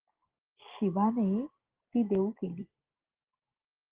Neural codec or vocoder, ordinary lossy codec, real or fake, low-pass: none; Opus, 16 kbps; real; 3.6 kHz